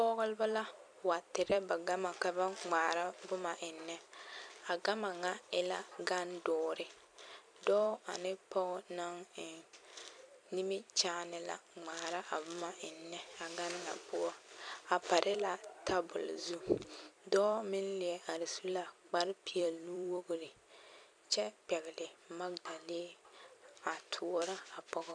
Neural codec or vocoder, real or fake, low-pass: vocoder, 44.1 kHz, 128 mel bands every 256 samples, BigVGAN v2; fake; 9.9 kHz